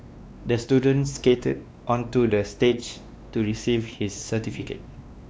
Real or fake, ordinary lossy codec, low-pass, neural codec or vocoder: fake; none; none; codec, 16 kHz, 2 kbps, X-Codec, WavLM features, trained on Multilingual LibriSpeech